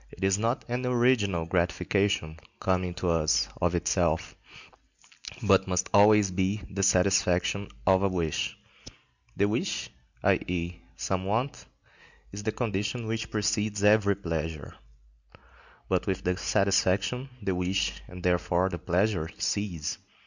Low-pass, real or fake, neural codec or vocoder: 7.2 kHz; real; none